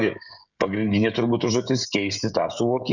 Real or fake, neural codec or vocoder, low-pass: fake; codec, 16 kHz, 16 kbps, FreqCodec, smaller model; 7.2 kHz